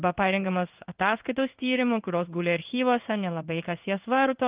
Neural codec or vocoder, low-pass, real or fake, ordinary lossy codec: codec, 16 kHz in and 24 kHz out, 1 kbps, XY-Tokenizer; 3.6 kHz; fake; Opus, 32 kbps